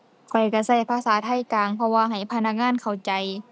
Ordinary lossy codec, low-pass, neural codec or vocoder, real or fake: none; none; none; real